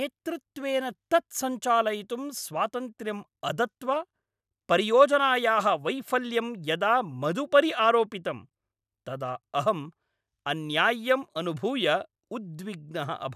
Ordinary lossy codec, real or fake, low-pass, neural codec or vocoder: none; real; 14.4 kHz; none